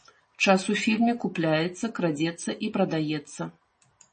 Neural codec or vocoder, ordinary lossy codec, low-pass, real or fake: none; MP3, 32 kbps; 10.8 kHz; real